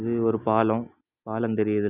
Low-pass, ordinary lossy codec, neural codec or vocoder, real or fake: 3.6 kHz; none; none; real